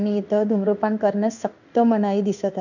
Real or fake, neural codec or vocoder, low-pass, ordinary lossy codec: fake; codec, 16 kHz in and 24 kHz out, 1 kbps, XY-Tokenizer; 7.2 kHz; none